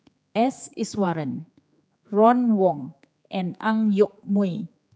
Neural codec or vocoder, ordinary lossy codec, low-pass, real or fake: codec, 16 kHz, 4 kbps, X-Codec, HuBERT features, trained on general audio; none; none; fake